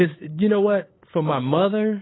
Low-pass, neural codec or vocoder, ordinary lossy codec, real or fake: 7.2 kHz; codec, 16 kHz, 8 kbps, FunCodec, trained on LibriTTS, 25 frames a second; AAC, 16 kbps; fake